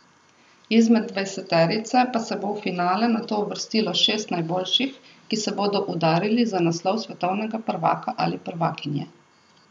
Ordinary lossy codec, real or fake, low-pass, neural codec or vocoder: none; real; 9.9 kHz; none